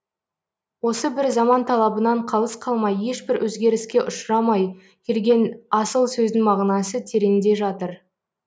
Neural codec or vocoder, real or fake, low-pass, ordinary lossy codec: none; real; none; none